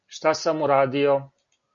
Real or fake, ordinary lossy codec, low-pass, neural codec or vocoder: real; MP3, 96 kbps; 7.2 kHz; none